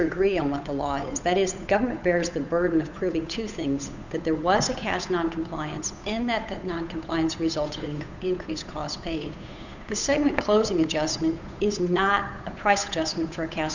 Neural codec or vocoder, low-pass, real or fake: codec, 16 kHz, 8 kbps, FunCodec, trained on Chinese and English, 25 frames a second; 7.2 kHz; fake